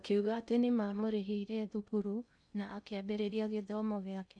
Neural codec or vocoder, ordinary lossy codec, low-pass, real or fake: codec, 16 kHz in and 24 kHz out, 0.6 kbps, FocalCodec, streaming, 2048 codes; none; 9.9 kHz; fake